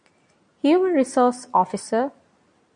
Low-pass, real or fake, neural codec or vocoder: 9.9 kHz; real; none